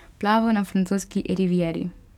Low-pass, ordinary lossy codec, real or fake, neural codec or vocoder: 19.8 kHz; none; fake; codec, 44.1 kHz, 7.8 kbps, DAC